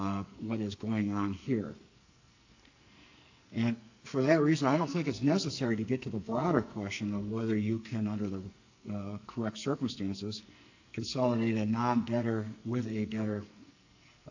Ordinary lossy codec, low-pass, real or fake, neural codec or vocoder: AAC, 48 kbps; 7.2 kHz; fake; codec, 44.1 kHz, 2.6 kbps, SNAC